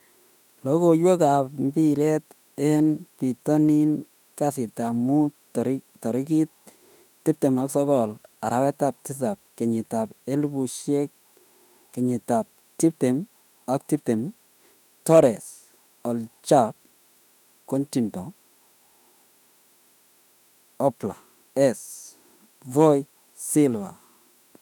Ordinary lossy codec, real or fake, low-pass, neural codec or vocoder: none; fake; 19.8 kHz; autoencoder, 48 kHz, 32 numbers a frame, DAC-VAE, trained on Japanese speech